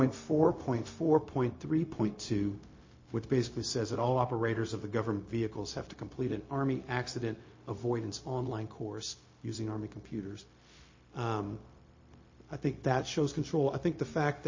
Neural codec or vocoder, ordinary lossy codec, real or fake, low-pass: codec, 16 kHz, 0.4 kbps, LongCat-Audio-Codec; MP3, 32 kbps; fake; 7.2 kHz